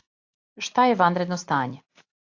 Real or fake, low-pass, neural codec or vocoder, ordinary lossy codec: real; 7.2 kHz; none; AAC, 48 kbps